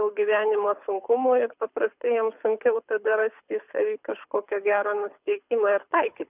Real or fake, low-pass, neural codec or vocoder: fake; 3.6 kHz; codec, 24 kHz, 6 kbps, HILCodec